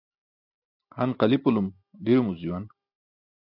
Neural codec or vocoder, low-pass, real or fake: none; 5.4 kHz; real